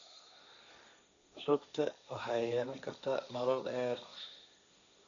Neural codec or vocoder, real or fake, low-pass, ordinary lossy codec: codec, 16 kHz, 1.1 kbps, Voila-Tokenizer; fake; 7.2 kHz; none